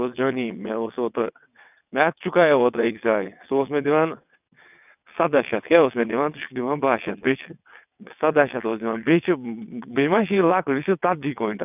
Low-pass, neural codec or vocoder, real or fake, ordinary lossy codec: 3.6 kHz; vocoder, 22.05 kHz, 80 mel bands, WaveNeXt; fake; none